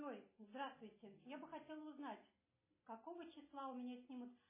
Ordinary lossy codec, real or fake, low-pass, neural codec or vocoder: MP3, 16 kbps; real; 3.6 kHz; none